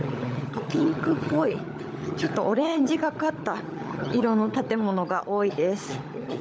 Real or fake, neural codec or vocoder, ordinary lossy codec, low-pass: fake; codec, 16 kHz, 16 kbps, FunCodec, trained on LibriTTS, 50 frames a second; none; none